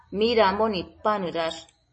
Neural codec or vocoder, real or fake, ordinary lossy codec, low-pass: none; real; MP3, 32 kbps; 10.8 kHz